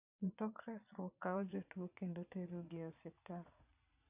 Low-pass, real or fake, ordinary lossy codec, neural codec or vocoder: 3.6 kHz; fake; AAC, 32 kbps; vocoder, 22.05 kHz, 80 mel bands, Vocos